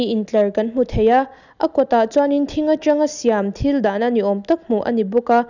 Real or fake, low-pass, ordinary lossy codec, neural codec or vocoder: real; 7.2 kHz; none; none